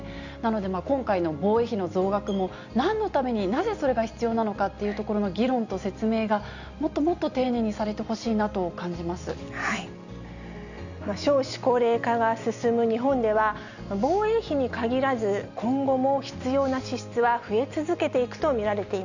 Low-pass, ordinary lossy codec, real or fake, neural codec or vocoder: 7.2 kHz; none; real; none